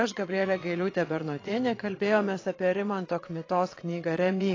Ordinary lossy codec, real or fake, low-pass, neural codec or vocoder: AAC, 32 kbps; fake; 7.2 kHz; vocoder, 22.05 kHz, 80 mel bands, WaveNeXt